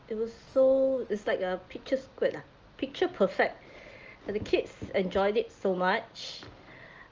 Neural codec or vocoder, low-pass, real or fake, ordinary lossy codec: none; 7.2 kHz; real; Opus, 24 kbps